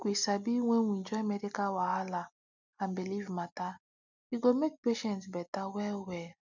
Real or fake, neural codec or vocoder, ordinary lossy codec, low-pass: real; none; none; 7.2 kHz